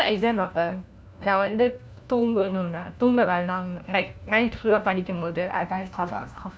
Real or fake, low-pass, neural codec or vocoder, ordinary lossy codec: fake; none; codec, 16 kHz, 1 kbps, FunCodec, trained on LibriTTS, 50 frames a second; none